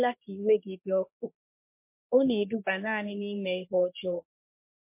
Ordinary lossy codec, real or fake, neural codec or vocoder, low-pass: MP3, 24 kbps; fake; codec, 44.1 kHz, 3.4 kbps, Pupu-Codec; 3.6 kHz